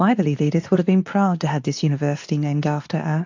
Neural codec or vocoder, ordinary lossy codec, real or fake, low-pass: codec, 24 kHz, 0.9 kbps, WavTokenizer, medium speech release version 2; AAC, 48 kbps; fake; 7.2 kHz